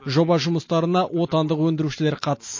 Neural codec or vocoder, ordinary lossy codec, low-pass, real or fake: none; MP3, 32 kbps; 7.2 kHz; real